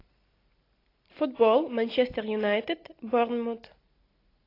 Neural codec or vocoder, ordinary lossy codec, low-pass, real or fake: none; AAC, 32 kbps; 5.4 kHz; real